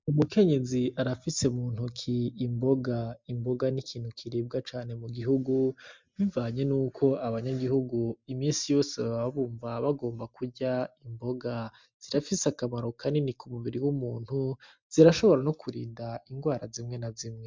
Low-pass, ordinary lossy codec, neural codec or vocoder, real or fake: 7.2 kHz; MP3, 64 kbps; none; real